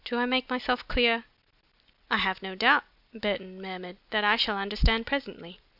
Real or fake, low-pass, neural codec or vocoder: real; 5.4 kHz; none